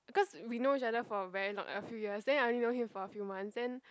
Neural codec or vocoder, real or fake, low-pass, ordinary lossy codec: none; real; none; none